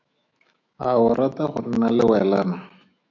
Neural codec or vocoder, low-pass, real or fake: autoencoder, 48 kHz, 128 numbers a frame, DAC-VAE, trained on Japanese speech; 7.2 kHz; fake